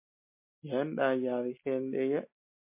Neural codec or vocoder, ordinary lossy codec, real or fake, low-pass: none; MP3, 24 kbps; real; 3.6 kHz